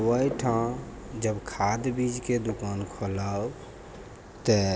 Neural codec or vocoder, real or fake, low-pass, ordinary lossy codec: none; real; none; none